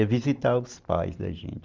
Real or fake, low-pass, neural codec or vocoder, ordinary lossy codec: real; 7.2 kHz; none; Opus, 32 kbps